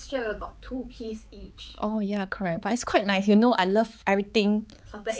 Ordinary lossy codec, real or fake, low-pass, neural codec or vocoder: none; fake; none; codec, 16 kHz, 4 kbps, X-Codec, HuBERT features, trained on balanced general audio